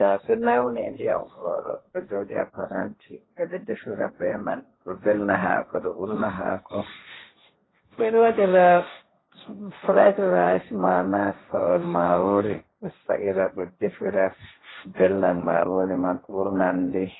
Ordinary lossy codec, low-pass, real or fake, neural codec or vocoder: AAC, 16 kbps; 7.2 kHz; fake; codec, 16 kHz, 1.1 kbps, Voila-Tokenizer